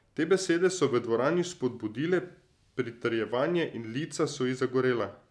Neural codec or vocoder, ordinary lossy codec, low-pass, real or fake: none; none; none; real